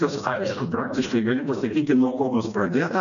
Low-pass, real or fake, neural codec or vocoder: 7.2 kHz; fake; codec, 16 kHz, 1 kbps, FreqCodec, smaller model